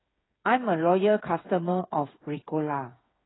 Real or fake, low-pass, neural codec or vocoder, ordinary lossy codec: fake; 7.2 kHz; codec, 16 kHz, 8 kbps, FreqCodec, smaller model; AAC, 16 kbps